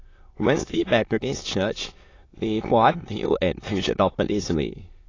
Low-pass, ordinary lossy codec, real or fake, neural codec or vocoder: 7.2 kHz; AAC, 32 kbps; fake; autoencoder, 22.05 kHz, a latent of 192 numbers a frame, VITS, trained on many speakers